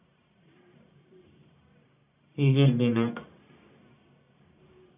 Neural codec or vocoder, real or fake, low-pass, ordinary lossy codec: codec, 44.1 kHz, 1.7 kbps, Pupu-Codec; fake; 3.6 kHz; none